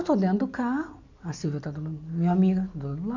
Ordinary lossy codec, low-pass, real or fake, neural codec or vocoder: none; 7.2 kHz; real; none